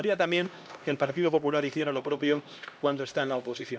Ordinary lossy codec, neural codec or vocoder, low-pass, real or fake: none; codec, 16 kHz, 1 kbps, X-Codec, HuBERT features, trained on LibriSpeech; none; fake